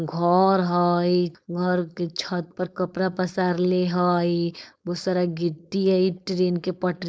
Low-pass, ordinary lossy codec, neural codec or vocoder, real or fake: none; none; codec, 16 kHz, 4.8 kbps, FACodec; fake